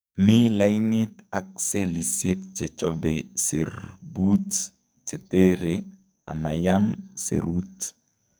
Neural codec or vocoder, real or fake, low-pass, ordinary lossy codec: codec, 44.1 kHz, 2.6 kbps, SNAC; fake; none; none